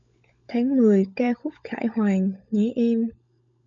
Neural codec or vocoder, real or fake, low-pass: codec, 16 kHz, 16 kbps, FunCodec, trained on LibriTTS, 50 frames a second; fake; 7.2 kHz